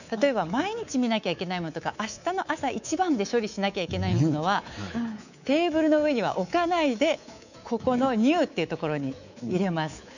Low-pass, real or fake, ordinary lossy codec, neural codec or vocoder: 7.2 kHz; fake; none; codec, 24 kHz, 3.1 kbps, DualCodec